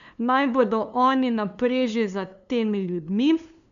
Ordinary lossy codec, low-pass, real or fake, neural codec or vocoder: none; 7.2 kHz; fake; codec, 16 kHz, 2 kbps, FunCodec, trained on LibriTTS, 25 frames a second